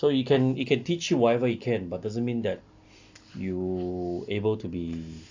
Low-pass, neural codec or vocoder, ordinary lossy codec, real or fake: 7.2 kHz; none; AAC, 48 kbps; real